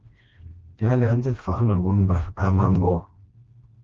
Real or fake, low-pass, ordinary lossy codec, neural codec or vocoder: fake; 7.2 kHz; Opus, 32 kbps; codec, 16 kHz, 1 kbps, FreqCodec, smaller model